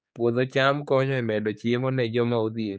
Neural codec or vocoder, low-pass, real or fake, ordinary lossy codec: codec, 16 kHz, 4 kbps, X-Codec, HuBERT features, trained on general audio; none; fake; none